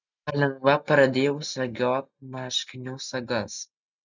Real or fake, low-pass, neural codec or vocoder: real; 7.2 kHz; none